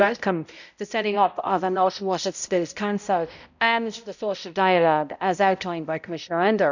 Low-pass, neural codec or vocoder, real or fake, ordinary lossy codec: 7.2 kHz; codec, 16 kHz, 0.5 kbps, X-Codec, HuBERT features, trained on balanced general audio; fake; none